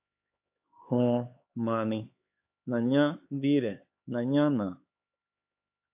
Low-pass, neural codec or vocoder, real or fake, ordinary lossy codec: 3.6 kHz; codec, 16 kHz, 4 kbps, X-Codec, HuBERT features, trained on LibriSpeech; fake; AAC, 32 kbps